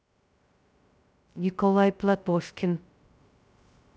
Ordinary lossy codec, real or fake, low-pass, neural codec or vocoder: none; fake; none; codec, 16 kHz, 0.2 kbps, FocalCodec